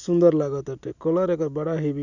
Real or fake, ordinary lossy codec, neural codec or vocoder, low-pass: real; none; none; 7.2 kHz